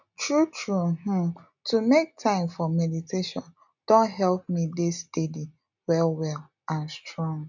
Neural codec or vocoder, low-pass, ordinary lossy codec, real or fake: none; 7.2 kHz; none; real